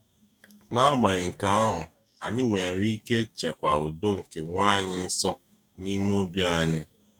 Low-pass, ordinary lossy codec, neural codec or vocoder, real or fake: 19.8 kHz; none; codec, 44.1 kHz, 2.6 kbps, DAC; fake